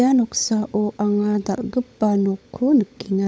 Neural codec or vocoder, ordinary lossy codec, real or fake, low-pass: codec, 16 kHz, 16 kbps, FunCodec, trained on LibriTTS, 50 frames a second; none; fake; none